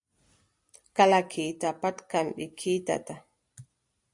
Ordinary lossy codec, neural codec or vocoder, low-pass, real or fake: AAC, 64 kbps; none; 10.8 kHz; real